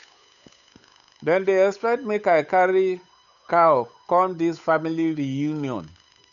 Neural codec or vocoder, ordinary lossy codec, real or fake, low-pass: codec, 16 kHz, 8 kbps, FunCodec, trained on Chinese and English, 25 frames a second; none; fake; 7.2 kHz